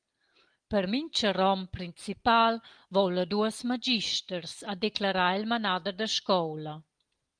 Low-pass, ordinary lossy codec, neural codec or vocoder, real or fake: 9.9 kHz; Opus, 24 kbps; none; real